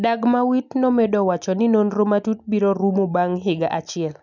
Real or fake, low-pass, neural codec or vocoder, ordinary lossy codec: real; 7.2 kHz; none; none